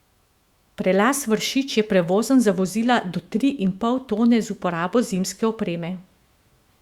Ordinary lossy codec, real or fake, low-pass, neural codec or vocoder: Opus, 64 kbps; fake; 19.8 kHz; autoencoder, 48 kHz, 128 numbers a frame, DAC-VAE, trained on Japanese speech